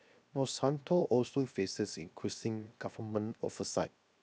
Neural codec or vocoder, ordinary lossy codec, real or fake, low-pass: codec, 16 kHz, 0.7 kbps, FocalCodec; none; fake; none